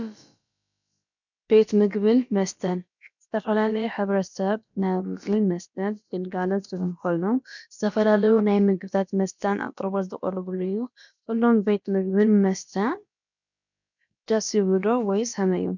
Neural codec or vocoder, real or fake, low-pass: codec, 16 kHz, about 1 kbps, DyCAST, with the encoder's durations; fake; 7.2 kHz